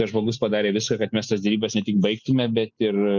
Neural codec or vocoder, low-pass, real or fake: none; 7.2 kHz; real